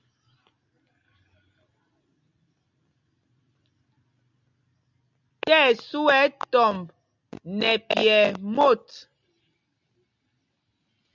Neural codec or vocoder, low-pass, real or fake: none; 7.2 kHz; real